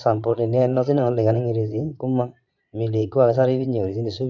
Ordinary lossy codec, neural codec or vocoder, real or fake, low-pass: none; vocoder, 22.05 kHz, 80 mel bands, Vocos; fake; 7.2 kHz